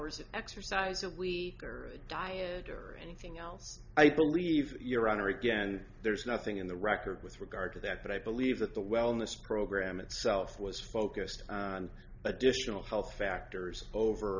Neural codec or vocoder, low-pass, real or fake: none; 7.2 kHz; real